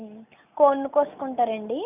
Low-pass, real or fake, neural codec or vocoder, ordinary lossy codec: 3.6 kHz; real; none; none